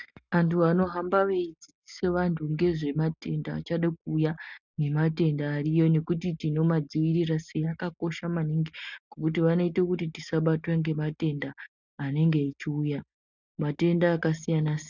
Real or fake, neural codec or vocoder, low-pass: real; none; 7.2 kHz